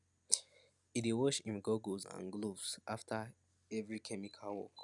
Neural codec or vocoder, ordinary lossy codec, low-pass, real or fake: none; none; 10.8 kHz; real